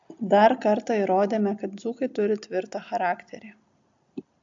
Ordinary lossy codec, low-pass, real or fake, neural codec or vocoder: AAC, 64 kbps; 7.2 kHz; real; none